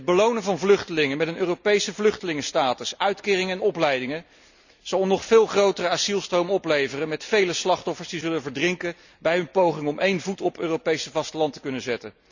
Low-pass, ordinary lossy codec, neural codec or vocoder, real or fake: 7.2 kHz; none; none; real